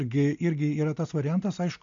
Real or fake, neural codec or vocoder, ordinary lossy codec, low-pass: real; none; AAC, 64 kbps; 7.2 kHz